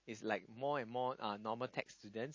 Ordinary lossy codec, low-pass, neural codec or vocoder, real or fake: MP3, 32 kbps; 7.2 kHz; none; real